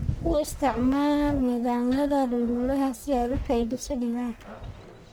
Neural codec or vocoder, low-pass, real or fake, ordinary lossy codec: codec, 44.1 kHz, 1.7 kbps, Pupu-Codec; none; fake; none